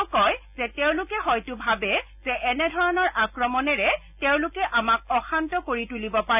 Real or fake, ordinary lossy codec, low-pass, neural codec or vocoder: real; none; 3.6 kHz; none